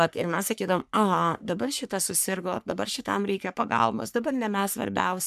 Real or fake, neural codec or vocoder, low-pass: fake; codec, 44.1 kHz, 3.4 kbps, Pupu-Codec; 14.4 kHz